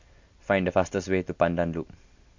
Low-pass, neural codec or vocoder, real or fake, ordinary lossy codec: 7.2 kHz; none; real; MP3, 48 kbps